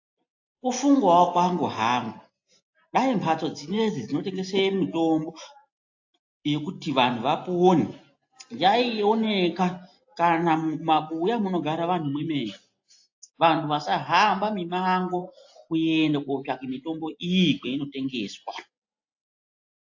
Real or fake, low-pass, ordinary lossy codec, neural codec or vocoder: real; 7.2 kHz; AAC, 48 kbps; none